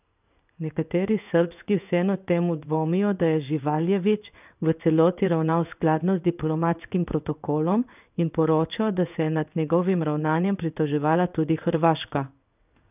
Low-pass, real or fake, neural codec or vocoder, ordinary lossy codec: 3.6 kHz; fake; codec, 16 kHz in and 24 kHz out, 1 kbps, XY-Tokenizer; none